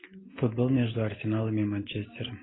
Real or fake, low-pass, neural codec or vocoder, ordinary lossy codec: real; 7.2 kHz; none; AAC, 16 kbps